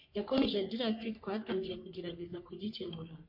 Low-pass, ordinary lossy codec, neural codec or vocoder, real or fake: 5.4 kHz; MP3, 32 kbps; codec, 44.1 kHz, 3.4 kbps, Pupu-Codec; fake